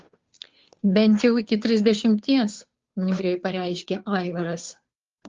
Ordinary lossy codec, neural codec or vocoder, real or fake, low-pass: Opus, 32 kbps; codec, 16 kHz, 2 kbps, FunCodec, trained on Chinese and English, 25 frames a second; fake; 7.2 kHz